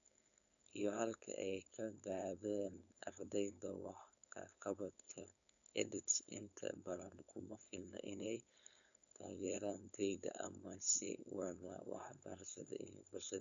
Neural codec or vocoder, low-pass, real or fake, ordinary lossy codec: codec, 16 kHz, 4.8 kbps, FACodec; 7.2 kHz; fake; none